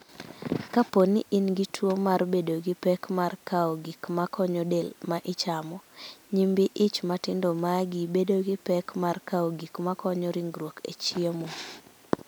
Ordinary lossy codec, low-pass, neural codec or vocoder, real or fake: none; none; none; real